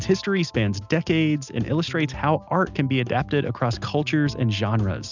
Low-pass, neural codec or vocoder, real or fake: 7.2 kHz; none; real